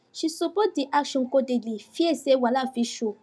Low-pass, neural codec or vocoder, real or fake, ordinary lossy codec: none; none; real; none